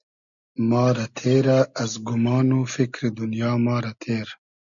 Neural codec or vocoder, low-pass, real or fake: none; 7.2 kHz; real